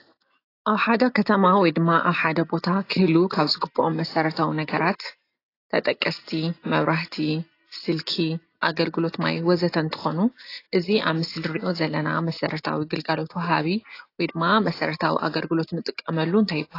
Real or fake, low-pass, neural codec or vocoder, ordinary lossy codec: real; 5.4 kHz; none; AAC, 32 kbps